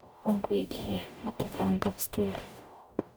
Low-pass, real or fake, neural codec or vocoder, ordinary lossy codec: none; fake; codec, 44.1 kHz, 0.9 kbps, DAC; none